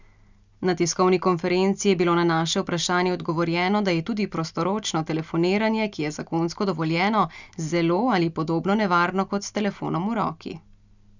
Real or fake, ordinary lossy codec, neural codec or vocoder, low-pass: real; none; none; 7.2 kHz